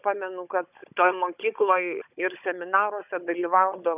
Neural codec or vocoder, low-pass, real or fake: codec, 16 kHz, 16 kbps, FunCodec, trained on Chinese and English, 50 frames a second; 3.6 kHz; fake